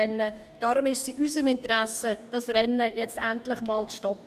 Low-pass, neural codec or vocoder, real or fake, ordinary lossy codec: 14.4 kHz; codec, 44.1 kHz, 2.6 kbps, DAC; fake; none